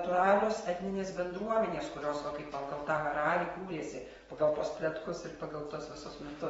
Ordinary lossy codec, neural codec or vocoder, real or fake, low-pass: AAC, 24 kbps; vocoder, 24 kHz, 100 mel bands, Vocos; fake; 10.8 kHz